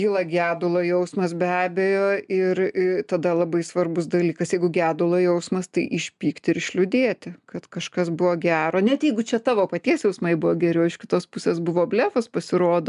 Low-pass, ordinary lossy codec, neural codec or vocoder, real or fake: 10.8 kHz; MP3, 96 kbps; none; real